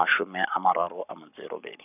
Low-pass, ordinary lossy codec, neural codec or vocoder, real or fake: 3.6 kHz; none; codec, 44.1 kHz, 7.8 kbps, Pupu-Codec; fake